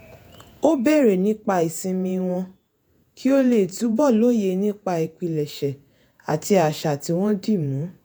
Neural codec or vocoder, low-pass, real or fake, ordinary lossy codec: vocoder, 48 kHz, 128 mel bands, Vocos; none; fake; none